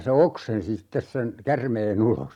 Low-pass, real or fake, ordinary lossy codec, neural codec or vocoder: 19.8 kHz; real; none; none